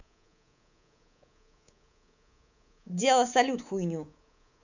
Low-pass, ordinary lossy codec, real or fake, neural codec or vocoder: 7.2 kHz; none; fake; codec, 24 kHz, 3.1 kbps, DualCodec